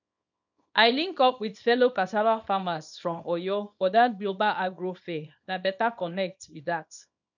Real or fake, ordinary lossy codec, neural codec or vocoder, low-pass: fake; none; codec, 24 kHz, 0.9 kbps, WavTokenizer, small release; 7.2 kHz